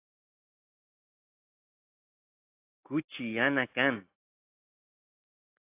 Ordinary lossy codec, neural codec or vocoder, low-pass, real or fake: AAC, 32 kbps; none; 3.6 kHz; real